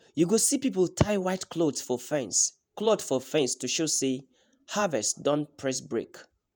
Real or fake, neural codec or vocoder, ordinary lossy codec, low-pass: fake; vocoder, 48 kHz, 128 mel bands, Vocos; none; none